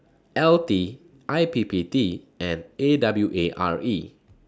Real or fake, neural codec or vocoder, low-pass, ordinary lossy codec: real; none; none; none